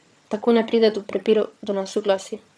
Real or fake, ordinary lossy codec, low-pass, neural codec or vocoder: fake; none; none; vocoder, 22.05 kHz, 80 mel bands, HiFi-GAN